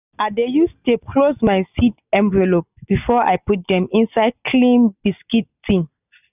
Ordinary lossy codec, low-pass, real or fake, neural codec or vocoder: none; 3.6 kHz; real; none